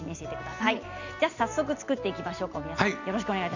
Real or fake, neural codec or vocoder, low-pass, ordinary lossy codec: real; none; 7.2 kHz; none